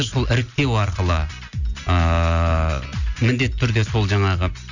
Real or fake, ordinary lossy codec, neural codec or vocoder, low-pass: real; none; none; 7.2 kHz